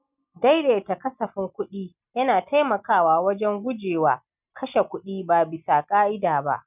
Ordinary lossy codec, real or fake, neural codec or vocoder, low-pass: none; real; none; 3.6 kHz